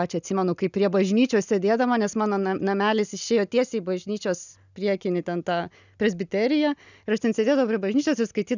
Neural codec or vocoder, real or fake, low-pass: none; real; 7.2 kHz